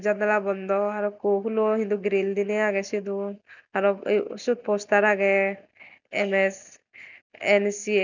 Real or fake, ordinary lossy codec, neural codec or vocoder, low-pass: real; none; none; 7.2 kHz